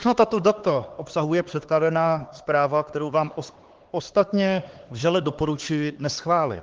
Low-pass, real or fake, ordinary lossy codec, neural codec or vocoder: 7.2 kHz; fake; Opus, 16 kbps; codec, 16 kHz, 4 kbps, X-Codec, HuBERT features, trained on LibriSpeech